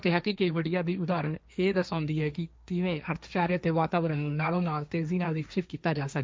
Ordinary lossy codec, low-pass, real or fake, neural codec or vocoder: none; none; fake; codec, 16 kHz, 1.1 kbps, Voila-Tokenizer